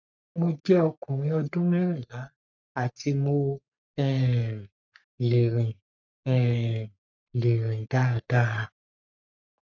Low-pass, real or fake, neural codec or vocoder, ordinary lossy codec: 7.2 kHz; fake; codec, 44.1 kHz, 3.4 kbps, Pupu-Codec; none